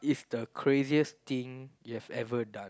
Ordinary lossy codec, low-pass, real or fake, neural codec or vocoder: none; none; real; none